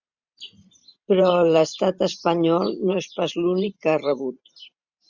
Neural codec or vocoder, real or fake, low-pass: vocoder, 44.1 kHz, 128 mel bands every 512 samples, BigVGAN v2; fake; 7.2 kHz